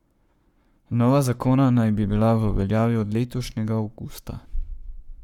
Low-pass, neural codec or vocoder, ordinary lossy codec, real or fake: 19.8 kHz; codec, 44.1 kHz, 7.8 kbps, Pupu-Codec; none; fake